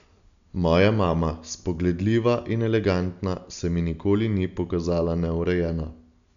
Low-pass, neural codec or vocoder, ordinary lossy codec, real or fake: 7.2 kHz; none; none; real